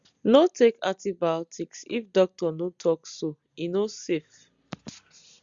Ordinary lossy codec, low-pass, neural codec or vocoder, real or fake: Opus, 64 kbps; 7.2 kHz; none; real